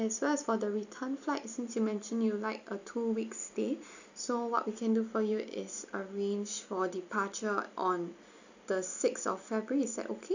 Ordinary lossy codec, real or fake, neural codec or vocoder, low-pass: none; real; none; 7.2 kHz